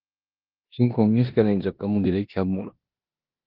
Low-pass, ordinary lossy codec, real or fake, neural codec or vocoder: 5.4 kHz; Opus, 24 kbps; fake; codec, 16 kHz in and 24 kHz out, 0.9 kbps, LongCat-Audio-Codec, four codebook decoder